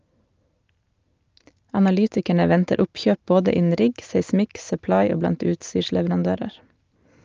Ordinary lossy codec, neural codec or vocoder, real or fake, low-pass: Opus, 24 kbps; none; real; 7.2 kHz